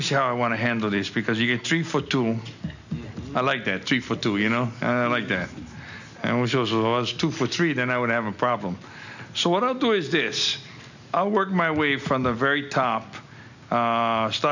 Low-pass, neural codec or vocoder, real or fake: 7.2 kHz; none; real